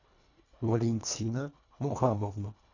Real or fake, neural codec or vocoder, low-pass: fake; codec, 24 kHz, 1.5 kbps, HILCodec; 7.2 kHz